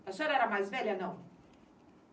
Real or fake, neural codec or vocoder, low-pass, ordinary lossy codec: real; none; none; none